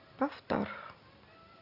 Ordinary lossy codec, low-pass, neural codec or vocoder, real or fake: AAC, 48 kbps; 5.4 kHz; none; real